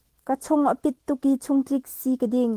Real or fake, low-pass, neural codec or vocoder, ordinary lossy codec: fake; 14.4 kHz; autoencoder, 48 kHz, 128 numbers a frame, DAC-VAE, trained on Japanese speech; Opus, 16 kbps